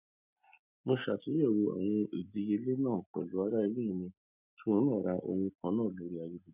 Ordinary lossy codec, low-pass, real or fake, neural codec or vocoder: none; 3.6 kHz; real; none